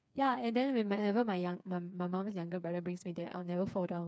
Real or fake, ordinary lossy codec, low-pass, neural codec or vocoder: fake; none; none; codec, 16 kHz, 4 kbps, FreqCodec, smaller model